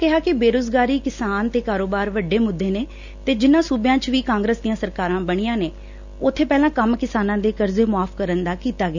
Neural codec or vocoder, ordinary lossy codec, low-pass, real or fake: none; none; 7.2 kHz; real